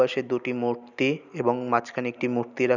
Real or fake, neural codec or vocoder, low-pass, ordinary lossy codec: real; none; 7.2 kHz; none